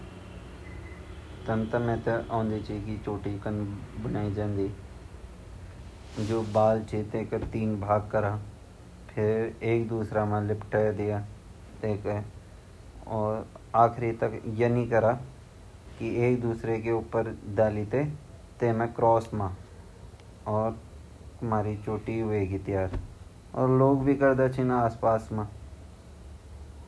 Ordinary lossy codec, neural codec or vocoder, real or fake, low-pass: none; none; real; none